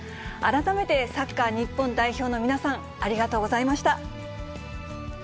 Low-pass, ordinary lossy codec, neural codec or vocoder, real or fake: none; none; none; real